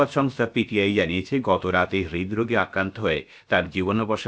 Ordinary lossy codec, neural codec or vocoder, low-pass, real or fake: none; codec, 16 kHz, about 1 kbps, DyCAST, with the encoder's durations; none; fake